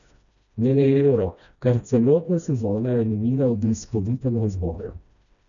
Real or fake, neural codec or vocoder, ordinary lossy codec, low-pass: fake; codec, 16 kHz, 1 kbps, FreqCodec, smaller model; none; 7.2 kHz